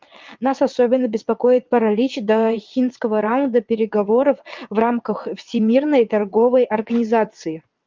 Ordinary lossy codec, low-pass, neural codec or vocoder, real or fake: Opus, 24 kbps; 7.2 kHz; vocoder, 22.05 kHz, 80 mel bands, WaveNeXt; fake